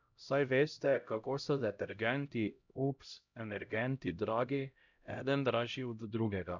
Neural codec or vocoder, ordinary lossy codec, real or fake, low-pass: codec, 16 kHz, 0.5 kbps, X-Codec, HuBERT features, trained on LibriSpeech; none; fake; 7.2 kHz